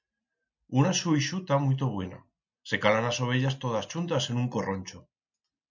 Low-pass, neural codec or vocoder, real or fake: 7.2 kHz; none; real